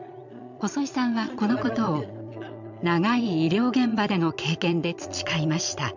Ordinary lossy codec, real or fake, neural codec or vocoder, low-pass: none; fake; vocoder, 22.05 kHz, 80 mel bands, Vocos; 7.2 kHz